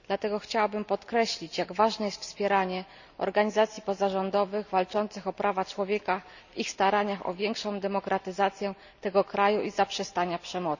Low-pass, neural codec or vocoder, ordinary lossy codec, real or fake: 7.2 kHz; none; none; real